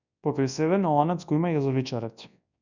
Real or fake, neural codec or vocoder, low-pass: fake; codec, 24 kHz, 0.9 kbps, WavTokenizer, large speech release; 7.2 kHz